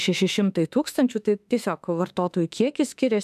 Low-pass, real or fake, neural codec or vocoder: 14.4 kHz; fake; autoencoder, 48 kHz, 32 numbers a frame, DAC-VAE, trained on Japanese speech